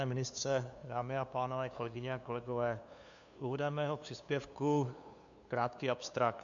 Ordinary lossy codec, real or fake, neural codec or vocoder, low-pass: MP3, 64 kbps; fake; codec, 16 kHz, 2 kbps, FunCodec, trained on LibriTTS, 25 frames a second; 7.2 kHz